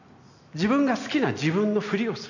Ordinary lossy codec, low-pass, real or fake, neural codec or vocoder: none; 7.2 kHz; real; none